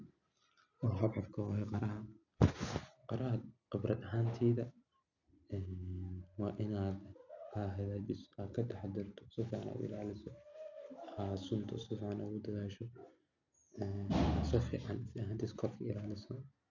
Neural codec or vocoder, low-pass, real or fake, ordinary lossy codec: none; 7.2 kHz; real; none